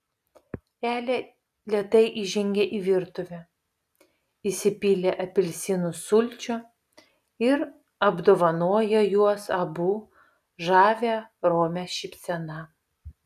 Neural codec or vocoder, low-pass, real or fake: none; 14.4 kHz; real